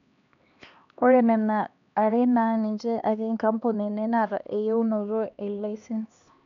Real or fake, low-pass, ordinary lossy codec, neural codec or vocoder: fake; 7.2 kHz; none; codec, 16 kHz, 2 kbps, X-Codec, HuBERT features, trained on LibriSpeech